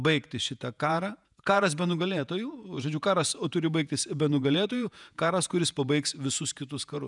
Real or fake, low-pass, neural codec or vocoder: fake; 10.8 kHz; vocoder, 48 kHz, 128 mel bands, Vocos